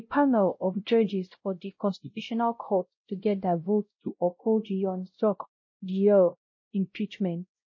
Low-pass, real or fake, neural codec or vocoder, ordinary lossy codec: 7.2 kHz; fake; codec, 16 kHz, 0.5 kbps, X-Codec, WavLM features, trained on Multilingual LibriSpeech; MP3, 32 kbps